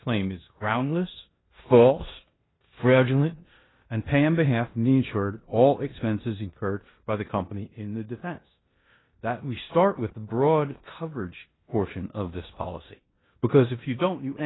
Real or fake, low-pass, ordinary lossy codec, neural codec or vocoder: fake; 7.2 kHz; AAC, 16 kbps; codec, 16 kHz in and 24 kHz out, 0.9 kbps, LongCat-Audio-Codec, four codebook decoder